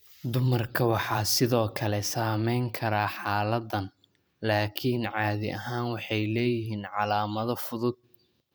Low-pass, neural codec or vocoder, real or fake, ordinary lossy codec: none; vocoder, 44.1 kHz, 128 mel bands every 512 samples, BigVGAN v2; fake; none